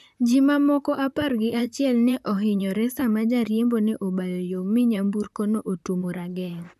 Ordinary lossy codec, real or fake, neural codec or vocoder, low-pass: none; fake; vocoder, 44.1 kHz, 128 mel bands, Pupu-Vocoder; 14.4 kHz